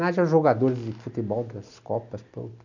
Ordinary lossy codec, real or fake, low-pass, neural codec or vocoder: none; real; 7.2 kHz; none